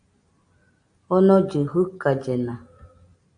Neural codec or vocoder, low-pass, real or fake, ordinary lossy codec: none; 9.9 kHz; real; AAC, 64 kbps